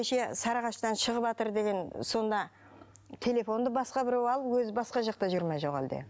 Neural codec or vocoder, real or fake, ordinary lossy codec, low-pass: none; real; none; none